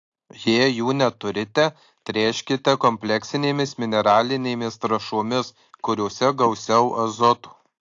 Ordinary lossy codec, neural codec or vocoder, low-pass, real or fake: AAC, 48 kbps; none; 7.2 kHz; real